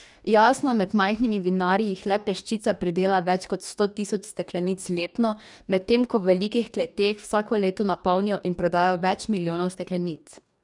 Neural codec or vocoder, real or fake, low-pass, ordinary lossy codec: codec, 44.1 kHz, 2.6 kbps, DAC; fake; 10.8 kHz; none